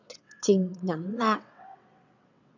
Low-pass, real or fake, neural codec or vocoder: 7.2 kHz; fake; vocoder, 22.05 kHz, 80 mel bands, WaveNeXt